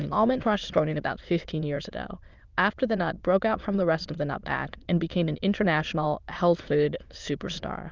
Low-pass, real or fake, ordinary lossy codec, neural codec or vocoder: 7.2 kHz; fake; Opus, 32 kbps; autoencoder, 22.05 kHz, a latent of 192 numbers a frame, VITS, trained on many speakers